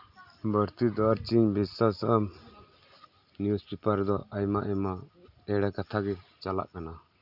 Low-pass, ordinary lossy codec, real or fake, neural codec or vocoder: 5.4 kHz; none; real; none